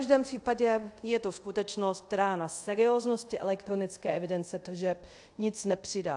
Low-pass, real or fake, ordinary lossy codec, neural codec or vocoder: 10.8 kHz; fake; AAC, 64 kbps; codec, 24 kHz, 0.5 kbps, DualCodec